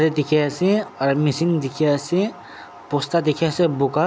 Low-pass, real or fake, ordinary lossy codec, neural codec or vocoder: none; real; none; none